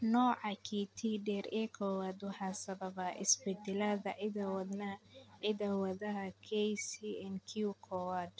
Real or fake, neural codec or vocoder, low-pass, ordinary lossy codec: real; none; none; none